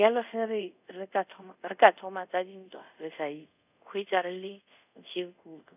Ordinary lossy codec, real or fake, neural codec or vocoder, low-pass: none; fake; codec, 24 kHz, 0.5 kbps, DualCodec; 3.6 kHz